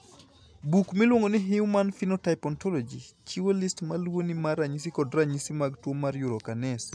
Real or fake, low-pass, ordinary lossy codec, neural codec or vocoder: real; none; none; none